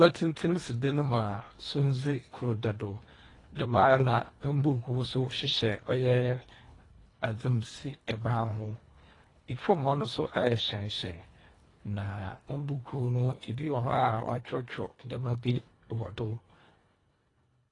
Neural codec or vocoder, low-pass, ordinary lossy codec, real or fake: codec, 24 kHz, 1.5 kbps, HILCodec; 10.8 kHz; AAC, 32 kbps; fake